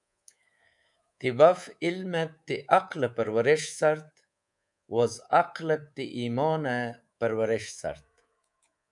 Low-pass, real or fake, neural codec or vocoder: 10.8 kHz; fake; codec, 24 kHz, 3.1 kbps, DualCodec